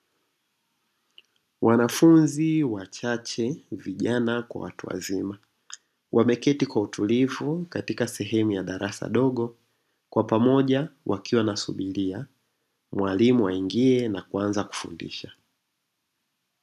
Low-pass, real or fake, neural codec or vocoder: 14.4 kHz; real; none